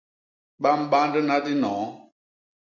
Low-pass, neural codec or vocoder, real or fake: 7.2 kHz; none; real